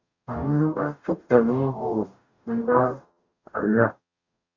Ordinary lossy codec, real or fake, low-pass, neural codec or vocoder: Opus, 64 kbps; fake; 7.2 kHz; codec, 44.1 kHz, 0.9 kbps, DAC